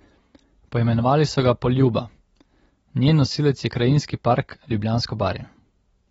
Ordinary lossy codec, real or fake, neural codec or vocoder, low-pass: AAC, 24 kbps; real; none; 19.8 kHz